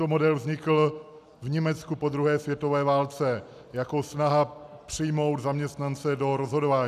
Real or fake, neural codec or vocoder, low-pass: real; none; 14.4 kHz